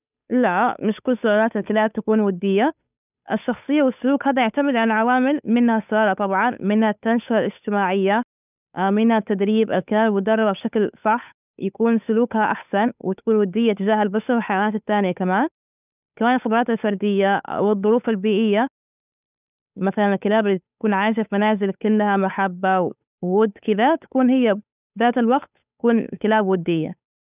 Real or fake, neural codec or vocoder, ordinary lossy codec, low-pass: fake; codec, 16 kHz, 8 kbps, FunCodec, trained on Chinese and English, 25 frames a second; none; 3.6 kHz